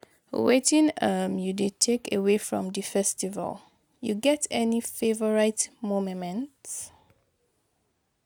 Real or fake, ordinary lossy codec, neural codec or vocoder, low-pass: real; none; none; none